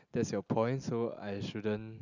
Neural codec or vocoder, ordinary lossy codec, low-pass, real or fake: none; none; 7.2 kHz; real